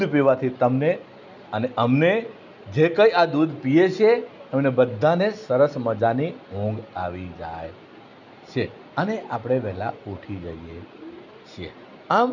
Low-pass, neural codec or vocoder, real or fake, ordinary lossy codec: 7.2 kHz; none; real; none